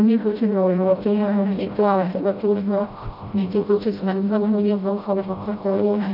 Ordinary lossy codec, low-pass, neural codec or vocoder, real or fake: none; 5.4 kHz; codec, 16 kHz, 0.5 kbps, FreqCodec, smaller model; fake